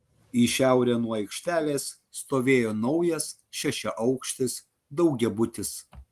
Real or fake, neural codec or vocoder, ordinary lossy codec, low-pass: real; none; Opus, 24 kbps; 14.4 kHz